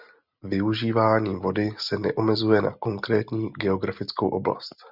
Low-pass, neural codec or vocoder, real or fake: 5.4 kHz; none; real